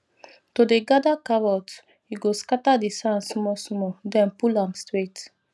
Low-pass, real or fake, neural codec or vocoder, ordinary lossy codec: none; real; none; none